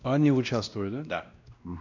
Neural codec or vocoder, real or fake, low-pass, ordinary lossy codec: codec, 16 kHz, 1 kbps, X-Codec, WavLM features, trained on Multilingual LibriSpeech; fake; 7.2 kHz; none